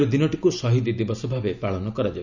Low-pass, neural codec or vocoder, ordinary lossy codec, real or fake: 7.2 kHz; none; none; real